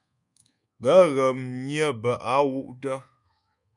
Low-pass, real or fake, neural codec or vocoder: 10.8 kHz; fake; codec, 24 kHz, 1.2 kbps, DualCodec